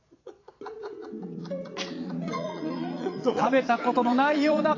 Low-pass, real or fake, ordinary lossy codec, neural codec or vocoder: 7.2 kHz; fake; AAC, 32 kbps; vocoder, 22.05 kHz, 80 mel bands, Vocos